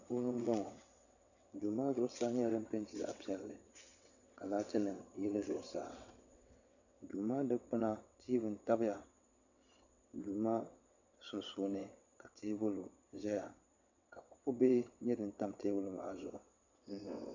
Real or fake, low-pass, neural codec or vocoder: fake; 7.2 kHz; vocoder, 22.05 kHz, 80 mel bands, WaveNeXt